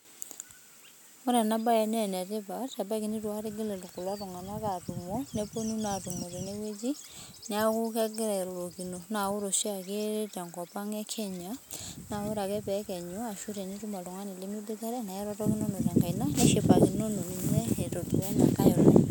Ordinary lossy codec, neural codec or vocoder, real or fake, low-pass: none; none; real; none